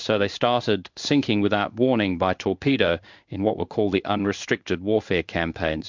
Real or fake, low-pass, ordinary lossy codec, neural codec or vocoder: fake; 7.2 kHz; MP3, 64 kbps; codec, 16 kHz in and 24 kHz out, 1 kbps, XY-Tokenizer